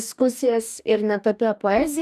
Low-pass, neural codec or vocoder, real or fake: 14.4 kHz; codec, 44.1 kHz, 2.6 kbps, DAC; fake